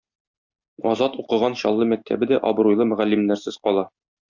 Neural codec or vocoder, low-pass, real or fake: none; 7.2 kHz; real